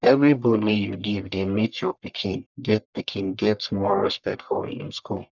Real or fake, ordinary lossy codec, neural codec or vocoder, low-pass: fake; none; codec, 44.1 kHz, 1.7 kbps, Pupu-Codec; 7.2 kHz